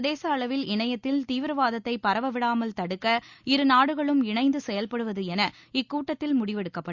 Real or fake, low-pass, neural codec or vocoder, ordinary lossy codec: real; 7.2 kHz; none; Opus, 64 kbps